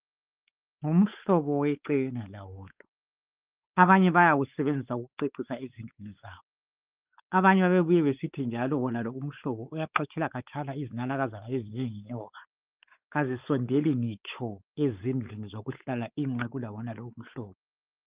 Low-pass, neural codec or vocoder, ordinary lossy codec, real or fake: 3.6 kHz; codec, 16 kHz, 4 kbps, X-Codec, WavLM features, trained on Multilingual LibriSpeech; Opus, 32 kbps; fake